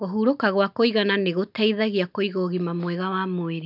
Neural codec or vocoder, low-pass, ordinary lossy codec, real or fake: none; 5.4 kHz; none; real